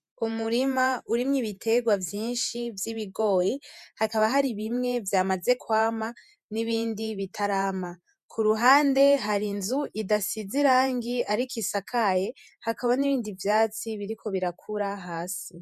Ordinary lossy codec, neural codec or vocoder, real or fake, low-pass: MP3, 96 kbps; vocoder, 48 kHz, 128 mel bands, Vocos; fake; 14.4 kHz